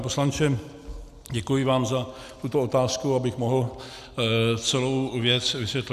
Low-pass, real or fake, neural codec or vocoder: 14.4 kHz; real; none